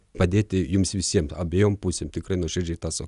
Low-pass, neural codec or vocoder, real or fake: 10.8 kHz; none; real